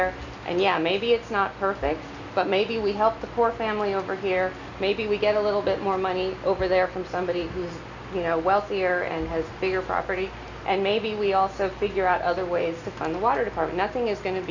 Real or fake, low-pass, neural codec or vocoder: real; 7.2 kHz; none